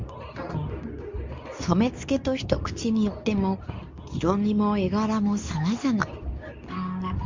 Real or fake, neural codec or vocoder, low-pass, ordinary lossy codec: fake; codec, 24 kHz, 0.9 kbps, WavTokenizer, medium speech release version 2; 7.2 kHz; MP3, 64 kbps